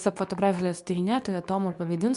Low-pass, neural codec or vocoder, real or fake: 10.8 kHz; codec, 24 kHz, 0.9 kbps, WavTokenizer, medium speech release version 2; fake